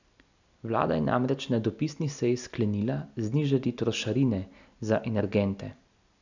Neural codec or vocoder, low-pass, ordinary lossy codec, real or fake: none; 7.2 kHz; none; real